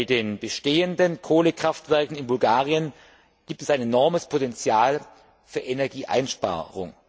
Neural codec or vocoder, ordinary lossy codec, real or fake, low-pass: none; none; real; none